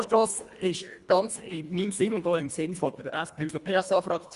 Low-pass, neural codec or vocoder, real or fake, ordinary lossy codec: 10.8 kHz; codec, 24 kHz, 1.5 kbps, HILCodec; fake; Opus, 64 kbps